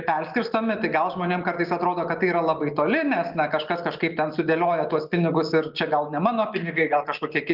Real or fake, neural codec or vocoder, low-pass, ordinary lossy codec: real; none; 5.4 kHz; Opus, 32 kbps